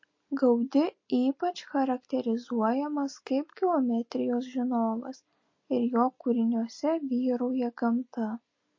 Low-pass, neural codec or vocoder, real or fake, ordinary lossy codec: 7.2 kHz; none; real; MP3, 32 kbps